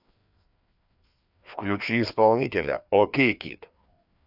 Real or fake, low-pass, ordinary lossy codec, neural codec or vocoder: fake; 5.4 kHz; none; codec, 16 kHz, 2 kbps, FreqCodec, larger model